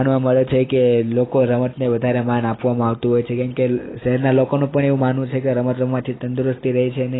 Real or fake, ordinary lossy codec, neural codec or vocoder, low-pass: real; AAC, 16 kbps; none; 7.2 kHz